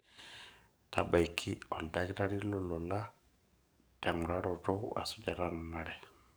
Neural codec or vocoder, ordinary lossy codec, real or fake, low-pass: codec, 44.1 kHz, 7.8 kbps, DAC; none; fake; none